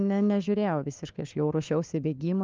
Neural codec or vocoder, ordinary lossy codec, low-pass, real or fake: codec, 16 kHz, 4 kbps, FunCodec, trained on LibriTTS, 50 frames a second; Opus, 24 kbps; 7.2 kHz; fake